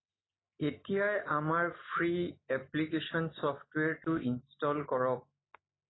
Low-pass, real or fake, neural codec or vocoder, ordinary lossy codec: 7.2 kHz; real; none; AAC, 16 kbps